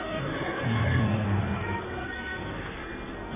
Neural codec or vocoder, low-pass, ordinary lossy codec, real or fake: codec, 16 kHz in and 24 kHz out, 1.1 kbps, FireRedTTS-2 codec; 3.6 kHz; AAC, 16 kbps; fake